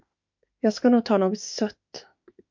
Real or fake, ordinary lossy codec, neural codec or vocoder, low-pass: fake; MP3, 48 kbps; autoencoder, 48 kHz, 32 numbers a frame, DAC-VAE, trained on Japanese speech; 7.2 kHz